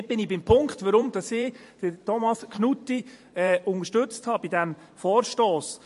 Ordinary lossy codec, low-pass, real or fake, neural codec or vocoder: MP3, 48 kbps; 14.4 kHz; fake; vocoder, 44.1 kHz, 128 mel bands every 512 samples, BigVGAN v2